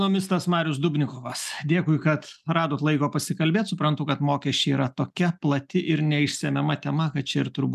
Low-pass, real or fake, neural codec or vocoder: 14.4 kHz; real; none